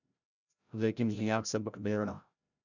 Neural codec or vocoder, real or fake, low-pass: codec, 16 kHz, 0.5 kbps, FreqCodec, larger model; fake; 7.2 kHz